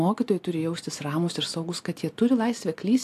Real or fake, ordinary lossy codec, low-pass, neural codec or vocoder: real; AAC, 64 kbps; 14.4 kHz; none